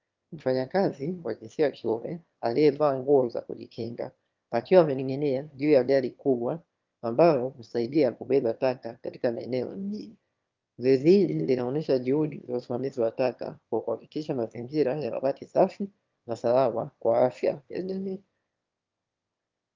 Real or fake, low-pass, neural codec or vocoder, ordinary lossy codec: fake; 7.2 kHz; autoencoder, 22.05 kHz, a latent of 192 numbers a frame, VITS, trained on one speaker; Opus, 24 kbps